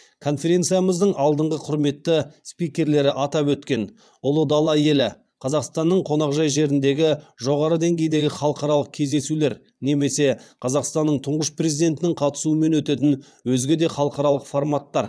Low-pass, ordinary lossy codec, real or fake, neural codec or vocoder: none; none; fake; vocoder, 22.05 kHz, 80 mel bands, Vocos